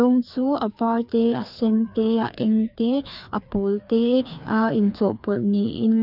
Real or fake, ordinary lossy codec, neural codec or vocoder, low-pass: fake; none; codec, 16 kHz, 2 kbps, FreqCodec, larger model; 5.4 kHz